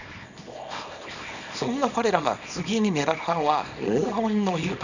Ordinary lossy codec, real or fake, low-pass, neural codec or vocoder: none; fake; 7.2 kHz; codec, 24 kHz, 0.9 kbps, WavTokenizer, small release